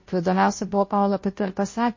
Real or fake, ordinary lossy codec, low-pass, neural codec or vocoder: fake; MP3, 32 kbps; 7.2 kHz; codec, 16 kHz, 0.5 kbps, FunCodec, trained on Chinese and English, 25 frames a second